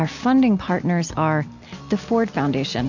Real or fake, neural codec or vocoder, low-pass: real; none; 7.2 kHz